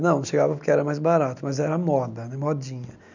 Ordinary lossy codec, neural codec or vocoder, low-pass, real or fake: none; none; 7.2 kHz; real